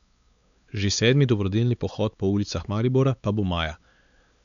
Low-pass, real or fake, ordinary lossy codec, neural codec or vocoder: 7.2 kHz; fake; none; codec, 16 kHz, 4 kbps, X-Codec, WavLM features, trained on Multilingual LibriSpeech